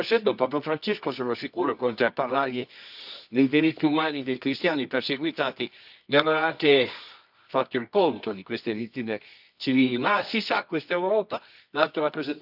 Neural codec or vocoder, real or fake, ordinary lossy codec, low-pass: codec, 24 kHz, 0.9 kbps, WavTokenizer, medium music audio release; fake; none; 5.4 kHz